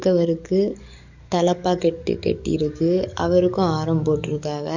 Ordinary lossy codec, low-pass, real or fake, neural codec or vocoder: none; 7.2 kHz; fake; codec, 44.1 kHz, 7.8 kbps, DAC